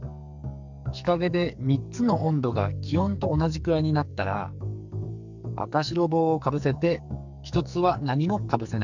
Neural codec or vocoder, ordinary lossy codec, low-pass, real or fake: codec, 44.1 kHz, 2.6 kbps, SNAC; none; 7.2 kHz; fake